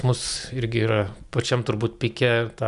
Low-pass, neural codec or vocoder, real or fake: 10.8 kHz; none; real